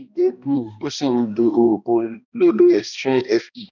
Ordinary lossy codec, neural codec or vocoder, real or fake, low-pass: none; codec, 16 kHz, 1 kbps, X-Codec, HuBERT features, trained on balanced general audio; fake; 7.2 kHz